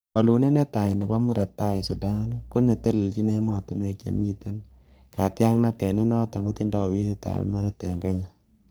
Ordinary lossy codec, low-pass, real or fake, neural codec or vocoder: none; none; fake; codec, 44.1 kHz, 3.4 kbps, Pupu-Codec